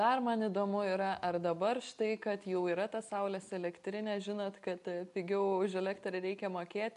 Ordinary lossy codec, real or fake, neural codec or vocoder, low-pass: AAC, 96 kbps; real; none; 10.8 kHz